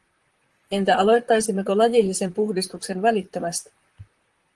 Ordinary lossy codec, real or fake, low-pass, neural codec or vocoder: Opus, 24 kbps; fake; 10.8 kHz; vocoder, 44.1 kHz, 128 mel bands, Pupu-Vocoder